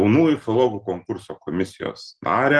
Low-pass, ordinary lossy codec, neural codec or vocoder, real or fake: 10.8 kHz; Opus, 16 kbps; none; real